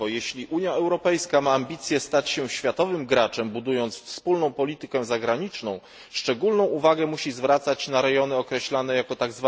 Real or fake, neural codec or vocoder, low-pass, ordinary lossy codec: real; none; none; none